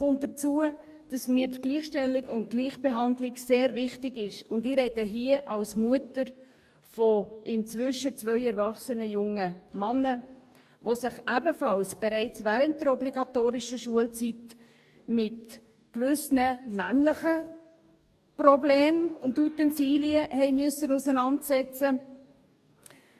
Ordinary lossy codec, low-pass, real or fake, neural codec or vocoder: AAC, 96 kbps; 14.4 kHz; fake; codec, 44.1 kHz, 2.6 kbps, DAC